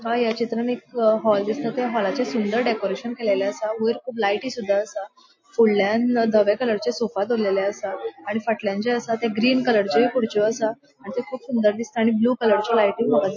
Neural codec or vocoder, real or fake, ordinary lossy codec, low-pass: none; real; MP3, 32 kbps; 7.2 kHz